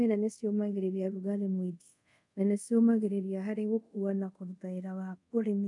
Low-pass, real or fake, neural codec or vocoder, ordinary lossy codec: 10.8 kHz; fake; codec, 24 kHz, 0.5 kbps, DualCodec; none